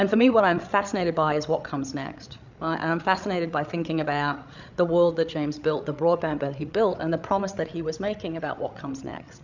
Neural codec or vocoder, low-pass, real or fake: codec, 16 kHz, 8 kbps, FreqCodec, larger model; 7.2 kHz; fake